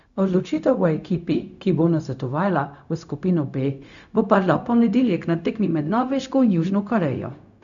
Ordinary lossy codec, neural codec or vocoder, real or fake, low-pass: none; codec, 16 kHz, 0.4 kbps, LongCat-Audio-Codec; fake; 7.2 kHz